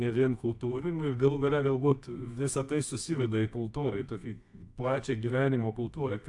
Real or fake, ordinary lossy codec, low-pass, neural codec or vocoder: fake; AAC, 64 kbps; 10.8 kHz; codec, 24 kHz, 0.9 kbps, WavTokenizer, medium music audio release